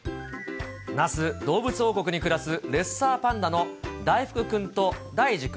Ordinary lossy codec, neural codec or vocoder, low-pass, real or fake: none; none; none; real